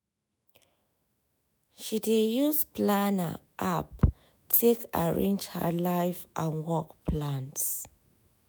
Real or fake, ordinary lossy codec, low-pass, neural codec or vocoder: fake; none; none; autoencoder, 48 kHz, 128 numbers a frame, DAC-VAE, trained on Japanese speech